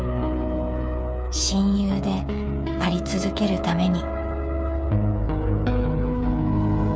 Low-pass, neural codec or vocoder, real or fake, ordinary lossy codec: none; codec, 16 kHz, 8 kbps, FreqCodec, smaller model; fake; none